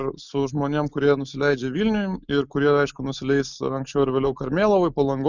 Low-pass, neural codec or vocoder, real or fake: 7.2 kHz; none; real